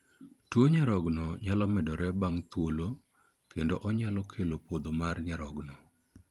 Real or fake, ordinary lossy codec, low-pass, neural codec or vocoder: fake; Opus, 32 kbps; 19.8 kHz; vocoder, 44.1 kHz, 128 mel bands every 512 samples, BigVGAN v2